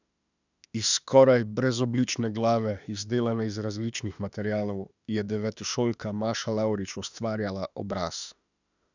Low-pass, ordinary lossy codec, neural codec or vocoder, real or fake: 7.2 kHz; none; autoencoder, 48 kHz, 32 numbers a frame, DAC-VAE, trained on Japanese speech; fake